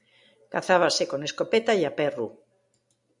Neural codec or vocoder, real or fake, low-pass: none; real; 10.8 kHz